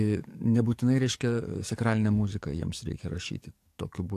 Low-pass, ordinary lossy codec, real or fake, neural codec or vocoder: 14.4 kHz; AAC, 64 kbps; fake; codec, 44.1 kHz, 7.8 kbps, DAC